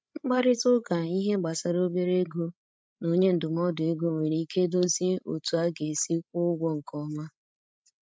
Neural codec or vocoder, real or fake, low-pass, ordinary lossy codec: codec, 16 kHz, 16 kbps, FreqCodec, larger model; fake; none; none